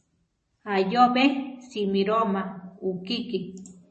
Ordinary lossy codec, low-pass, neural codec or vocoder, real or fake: MP3, 32 kbps; 10.8 kHz; vocoder, 44.1 kHz, 128 mel bands every 256 samples, BigVGAN v2; fake